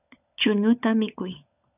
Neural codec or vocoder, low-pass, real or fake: codec, 16 kHz, 16 kbps, FunCodec, trained on LibriTTS, 50 frames a second; 3.6 kHz; fake